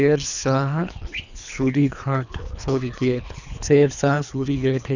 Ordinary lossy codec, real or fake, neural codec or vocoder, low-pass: none; fake; codec, 24 kHz, 3 kbps, HILCodec; 7.2 kHz